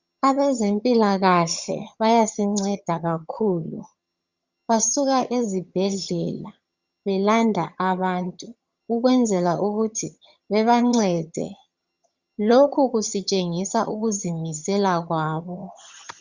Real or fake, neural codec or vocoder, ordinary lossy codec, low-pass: fake; vocoder, 22.05 kHz, 80 mel bands, HiFi-GAN; Opus, 64 kbps; 7.2 kHz